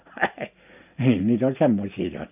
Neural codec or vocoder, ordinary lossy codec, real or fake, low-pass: none; AAC, 32 kbps; real; 3.6 kHz